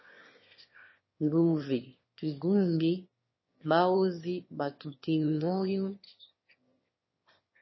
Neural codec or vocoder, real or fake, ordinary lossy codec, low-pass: autoencoder, 22.05 kHz, a latent of 192 numbers a frame, VITS, trained on one speaker; fake; MP3, 24 kbps; 7.2 kHz